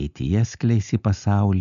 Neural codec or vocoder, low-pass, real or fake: none; 7.2 kHz; real